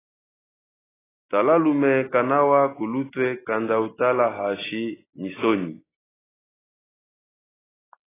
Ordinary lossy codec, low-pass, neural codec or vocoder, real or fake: AAC, 16 kbps; 3.6 kHz; none; real